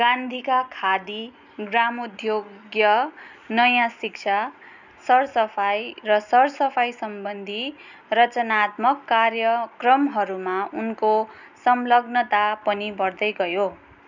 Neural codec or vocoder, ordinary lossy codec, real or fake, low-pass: none; none; real; 7.2 kHz